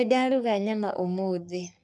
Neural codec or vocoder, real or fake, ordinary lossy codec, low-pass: codec, 44.1 kHz, 3.4 kbps, Pupu-Codec; fake; none; 10.8 kHz